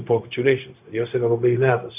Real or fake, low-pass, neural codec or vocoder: fake; 3.6 kHz; codec, 16 kHz, 0.4 kbps, LongCat-Audio-Codec